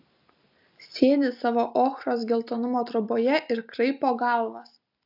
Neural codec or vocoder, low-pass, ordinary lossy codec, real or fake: none; 5.4 kHz; AAC, 48 kbps; real